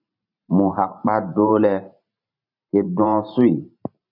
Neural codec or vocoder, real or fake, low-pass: vocoder, 44.1 kHz, 128 mel bands every 512 samples, BigVGAN v2; fake; 5.4 kHz